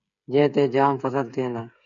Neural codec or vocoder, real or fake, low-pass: codec, 16 kHz, 8 kbps, FreqCodec, smaller model; fake; 7.2 kHz